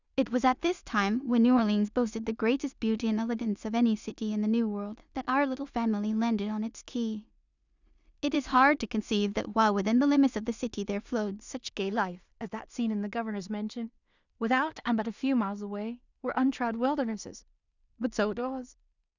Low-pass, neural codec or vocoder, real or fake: 7.2 kHz; codec, 16 kHz in and 24 kHz out, 0.4 kbps, LongCat-Audio-Codec, two codebook decoder; fake